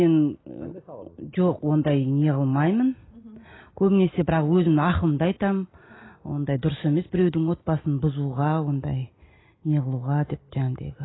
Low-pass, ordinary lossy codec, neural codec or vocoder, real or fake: 7.2 kHz; AAC, 16 kbps; none; real